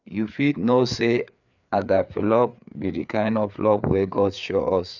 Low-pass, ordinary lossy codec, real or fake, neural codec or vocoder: 7.2 kHz; none; fake; codec, 16 kHz, 4 kbps, FreqCodec, larger model